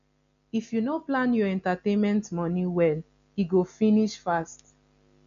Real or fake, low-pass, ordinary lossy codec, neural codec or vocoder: real; 7.2 kHz; none; none